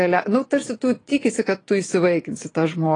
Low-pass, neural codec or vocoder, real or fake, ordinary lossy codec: 9.9 kHz; vocoder, 22.05 kHz, 80 mel bands, Vocos; fake; AAC, 32 kbps